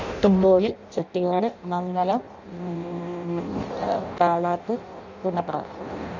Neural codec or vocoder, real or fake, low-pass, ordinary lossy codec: codec, 16 kHz in and 24 kHz out, 0.6 kbps, FireRedTTS-2 codec; fake; 7.2 kHz; none